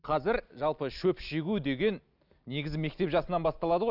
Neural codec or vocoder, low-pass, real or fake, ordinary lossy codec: none; 5.4 kHz; real; none